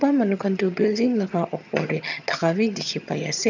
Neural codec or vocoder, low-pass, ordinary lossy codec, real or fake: vocoder, 22.05 kHz, 80 mel bands, HiFi-GAN; 7.2 kHz; none; fake